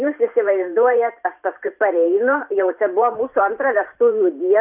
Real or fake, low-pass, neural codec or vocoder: fake; 3.6 kHz; vocoder, 44.1 kHz, 128 mel bands every 512 samples, BigVGAN v2